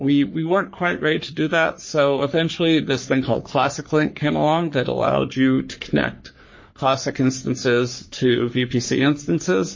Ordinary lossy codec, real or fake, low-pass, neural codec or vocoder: MP3, 32 kbps; fake; 7.2 kHz; codec, 44.1 kHz, 3.4 kbps, Pupu-Codec